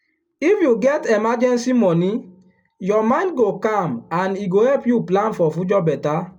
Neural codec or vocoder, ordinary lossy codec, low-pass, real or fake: none; none; 19.8 kHz; real